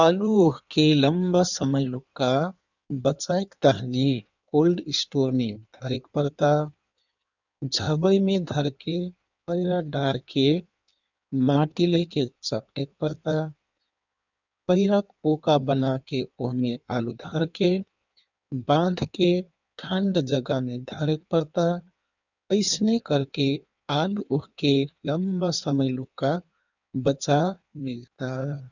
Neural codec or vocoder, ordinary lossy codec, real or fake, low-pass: codec, 16 kHz in and 24 kHz out, 1.1 kbps, FireRedTTS-2 codec; none; fake; 7.2 kHz